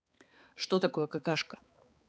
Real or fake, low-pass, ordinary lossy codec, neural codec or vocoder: fake; none; none; codec, 16 kHz, 4 kbps, X-Codec, HuBERT features, trained on balanced general audio